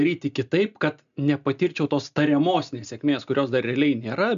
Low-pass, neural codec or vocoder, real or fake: 7.2 kHz; none; real